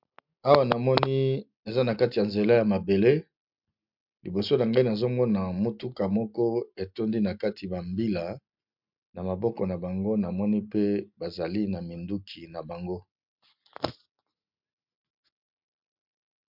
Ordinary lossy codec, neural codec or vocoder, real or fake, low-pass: MP3, 48 kbps; none; real; 5.4 kHz